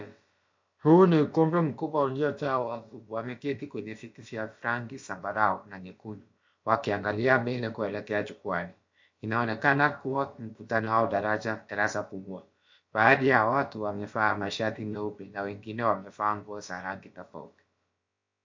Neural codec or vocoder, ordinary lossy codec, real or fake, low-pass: codec, 16 kHz, about 1 kbps, DyCAST, with the encoder's durations; MP3, 48 kbps; fake; 7.2 kHz